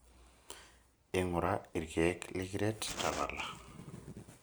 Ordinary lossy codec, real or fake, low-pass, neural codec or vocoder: none; real; none; none